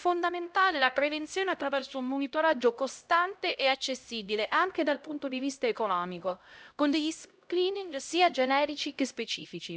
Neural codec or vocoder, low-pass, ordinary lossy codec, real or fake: codec, 16 kHz, 0.5 kbps, X-Codec, HuBERT features, trained on LibriSpeech; none; none; fake